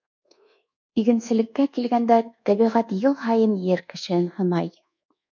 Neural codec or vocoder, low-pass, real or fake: codec, 24 kHz, 1.2 kbps, DualCodec; 7.2 kHz; fake